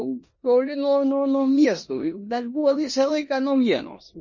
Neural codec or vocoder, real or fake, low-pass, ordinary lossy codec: codec, 16 kHz in and 24 kHz out, 0.9 kbps, LongCat-Audio-Codec, four codebook decoder; fake; 7.2 kHz; MP3, 32 kbps